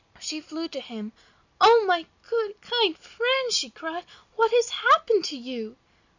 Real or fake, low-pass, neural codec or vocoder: real; 7.2 kHz; none